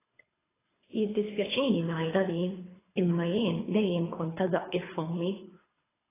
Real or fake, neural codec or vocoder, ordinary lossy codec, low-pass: fake; codec, 24 kHz, 3 kbps, HILCodec; AAC, 16 kbps; 3.6 kHz